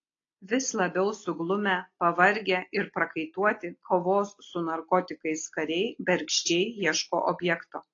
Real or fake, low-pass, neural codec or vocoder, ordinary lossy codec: real; 7.2 kHz; none; AAC, 32 kbps